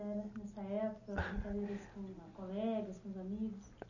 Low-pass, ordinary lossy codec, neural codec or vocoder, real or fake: 7.2 kHz; none; none; real